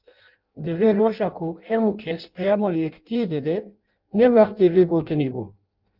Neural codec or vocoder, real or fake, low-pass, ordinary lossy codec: codec, 16 kHz in and 24 kHz out, 0.6 kbps, FireRedTTS-2 codec; fake; 5.4 kHz; Opus, 24 kbps